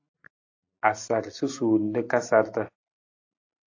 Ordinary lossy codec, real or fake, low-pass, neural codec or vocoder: AAC, 48 kbps; real; 7.2 kHz; none